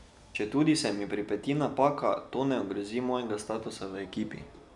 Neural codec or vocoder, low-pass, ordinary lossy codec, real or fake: none; 10.8 kHz; none; real